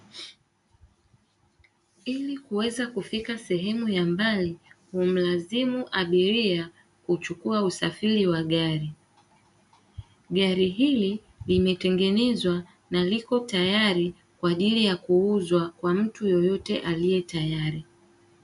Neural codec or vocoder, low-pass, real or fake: none; 10.8 kHz; real